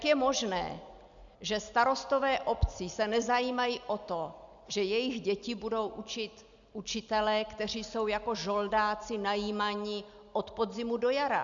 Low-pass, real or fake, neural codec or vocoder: 7.2 kHz; real; none